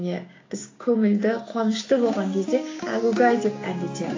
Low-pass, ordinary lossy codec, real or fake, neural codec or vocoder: 7.2 kHz; AAC, 32 kbps; fake; codec, 16 kHz, 6 kbps, DAC